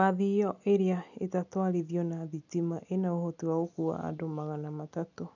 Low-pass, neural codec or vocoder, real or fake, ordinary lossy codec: 7.2 kHz; none; real; none